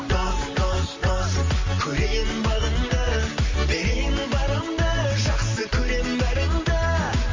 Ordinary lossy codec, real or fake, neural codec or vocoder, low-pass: MP3, 32 kbps; real; none; 7.2 kHz